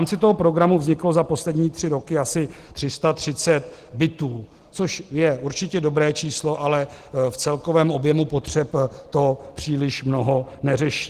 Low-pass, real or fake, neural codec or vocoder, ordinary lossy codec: 14.4 kHz; real; none; Opus, 16 kbps